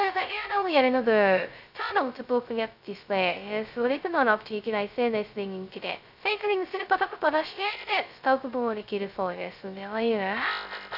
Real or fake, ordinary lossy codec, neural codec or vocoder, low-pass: fake; none; codec, 16 kHz, 0.2 kbps, FocalCodec; 5.4 kHz